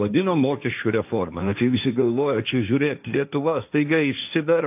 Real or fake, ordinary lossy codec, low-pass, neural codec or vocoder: fake; AAC, 32 kbps; 3.6 kHz; codec, 16 kHz, 1.1 kbps, Voila-Tokenizer